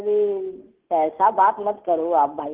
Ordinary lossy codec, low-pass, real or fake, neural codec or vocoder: Opus, 16 kbps; 3.6 kHz; real; none